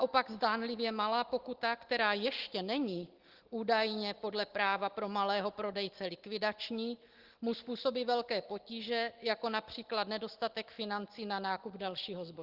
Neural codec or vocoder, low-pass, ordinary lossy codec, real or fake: none; 5.4 kHz; Opus, 16 kbps; real